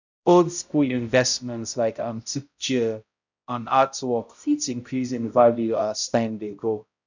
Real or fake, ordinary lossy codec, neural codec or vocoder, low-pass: fake; none; codec, 16 kHz, 0.5 kbps, X-Codec, HuBERT features, trained on balanced general audio; 7.2 kHz